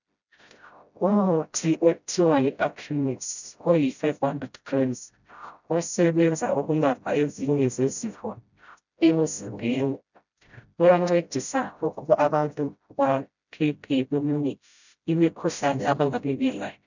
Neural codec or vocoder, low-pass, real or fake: codec, 16 kHz, 0.5 kbps, FreqCodec, smaller model; 7.2 kHz; fake